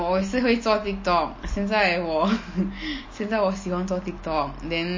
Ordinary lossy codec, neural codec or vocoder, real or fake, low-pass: MP3, 32 kbps; none; real; 7.2 kHz